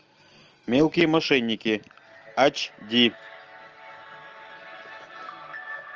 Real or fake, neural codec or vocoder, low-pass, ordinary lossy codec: real; none; 7.2 kHz; Opus, 24 kbps